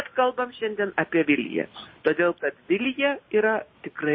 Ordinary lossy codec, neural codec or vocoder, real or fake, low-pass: MP3, 24 kbps; none; real; 7.2 kHz